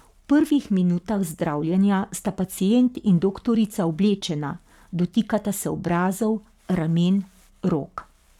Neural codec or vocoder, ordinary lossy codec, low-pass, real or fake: codec, 44.1 kHz, 7.8 kbps, Pupu-Codec; none; 19.8 kHz; fake